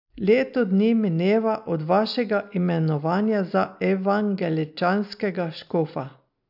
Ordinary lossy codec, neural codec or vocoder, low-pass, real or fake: AAC, 48 kbps; none; 5.4 kHz; real